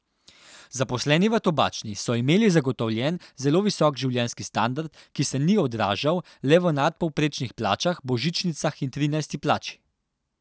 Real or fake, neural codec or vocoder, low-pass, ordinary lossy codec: real; none; none; none